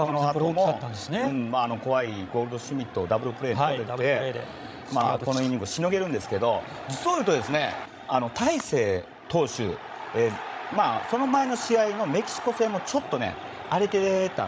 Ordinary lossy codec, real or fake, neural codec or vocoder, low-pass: none; fake; codec, 16 kHz, 16 kbps, FreqCodec, larger model; none